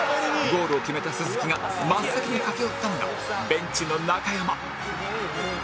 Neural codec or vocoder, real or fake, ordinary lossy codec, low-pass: none; real; none; none